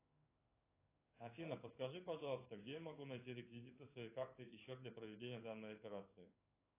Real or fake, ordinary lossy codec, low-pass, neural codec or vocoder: fake; AAC, 24 kbps; 3.6 kHz; codec, 16 kHz in and 24 kHz out, 1 kbps, XY-Tokenizer